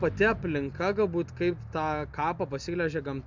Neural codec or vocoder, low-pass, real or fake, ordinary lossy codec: none; 7.2 kHz; real; MP3, 64 kbps